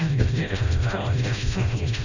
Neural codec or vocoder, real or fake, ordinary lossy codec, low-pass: codec, 16 kHz, 0.5 kbps, FreqCodec, smaller model; fake; none; 7.2 kHz